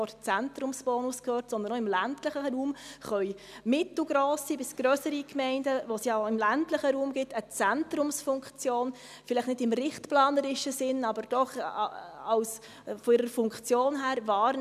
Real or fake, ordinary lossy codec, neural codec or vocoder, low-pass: real; none; none; 14.4 kHz